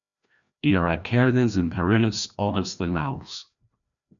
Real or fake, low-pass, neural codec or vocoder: fake; 7.2 kHz; codec, 16 kHz, 1 kbps, FreqCodec, larger model